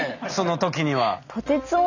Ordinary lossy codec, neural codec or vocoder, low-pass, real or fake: none; none; 7.2 kHz; real